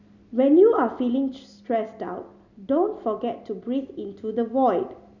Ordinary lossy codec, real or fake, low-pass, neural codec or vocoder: none; real; 7.2 kHz; none